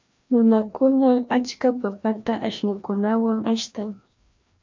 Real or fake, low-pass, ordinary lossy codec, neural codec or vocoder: fake; 7.2 kHz; MP3, 64 kbps; codec, 16 kHz, 1 kbps, FreqCodec, larger model